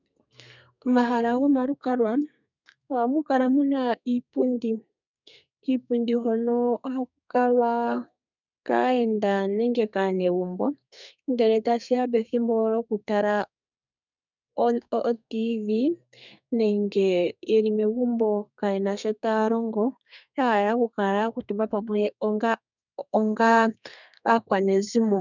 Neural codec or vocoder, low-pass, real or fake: codec, 32 kHz, 1.9 kbps, SNAC; 7.2 kHz; fake